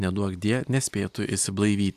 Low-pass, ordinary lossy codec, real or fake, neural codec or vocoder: 14.4 kHz; AAC, 96 kbps; real; none